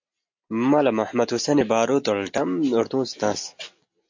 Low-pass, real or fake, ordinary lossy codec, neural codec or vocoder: 7.2 kHz; real; MP3, 48 kbps; none